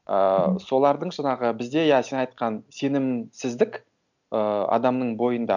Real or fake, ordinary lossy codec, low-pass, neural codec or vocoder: real; none; 7.2 kHz; none